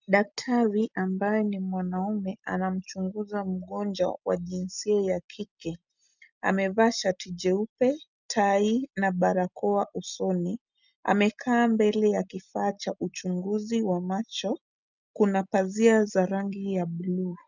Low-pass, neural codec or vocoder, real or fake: 7.2 kHz; none; real